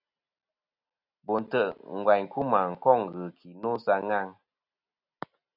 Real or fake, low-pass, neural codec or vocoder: real; 5.4 kHz; none